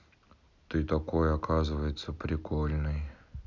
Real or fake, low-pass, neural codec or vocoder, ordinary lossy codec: real; 7.2 kHz; none; none